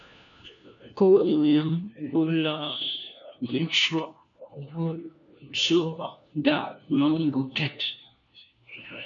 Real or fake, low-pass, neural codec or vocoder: fake; 7.2 kHz; codec, 16 kHz, 1 kbps, FunCodec, trained on LibriTTS, 50 frames a second